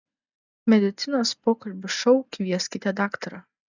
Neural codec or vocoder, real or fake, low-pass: none; real; 7.2 kHz